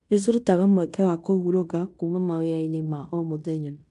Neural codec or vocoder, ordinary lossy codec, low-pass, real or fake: codec, 16 kHz in and 24 kHz out, 0.9 kbps, LongCat-Audio-Codec, fine tuned four codebook decoder; AAC, 96 kbps; 10.8 kHz; fake